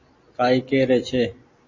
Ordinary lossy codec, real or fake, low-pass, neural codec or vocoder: MP3, 32 kbps; real; 7.2 kHz; none